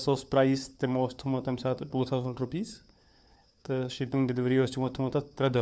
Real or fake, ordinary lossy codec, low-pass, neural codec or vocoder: fake; none; none; codec, 16 kHz, 4 kbps, FunCodec, trained on LibriTTS, 50 frames a second